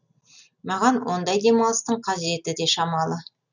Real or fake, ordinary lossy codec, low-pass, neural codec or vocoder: real; none; 7.2 kHz; none